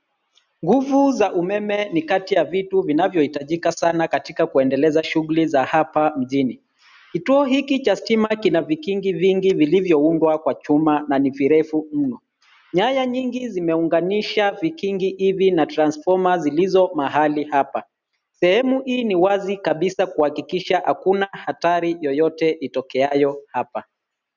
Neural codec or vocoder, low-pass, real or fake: none; 7.2 kHz; real